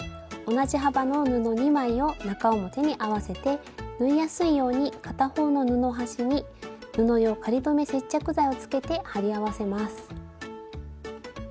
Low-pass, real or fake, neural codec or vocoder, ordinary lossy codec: none; real; none; none